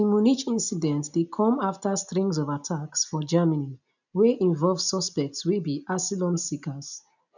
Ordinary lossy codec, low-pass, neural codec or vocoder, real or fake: none; 7.2 kHz; none; real